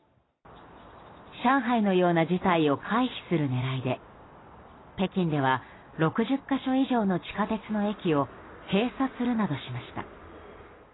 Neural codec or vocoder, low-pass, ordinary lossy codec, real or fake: none; 7.2 kHz; AAC, 16 kbps; real